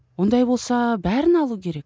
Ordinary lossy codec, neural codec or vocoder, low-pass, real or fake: none; none; none; real